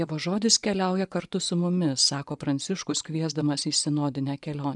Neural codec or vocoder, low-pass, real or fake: vocoder, 24 kHz, 100 mel bands, Vocos; 10.8 kHz; fake